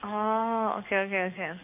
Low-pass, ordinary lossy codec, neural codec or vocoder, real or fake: 3.6 kHz; AAC, 32 kbps; codec, 44.1 kHz, 7.8 kbps, DAC; fake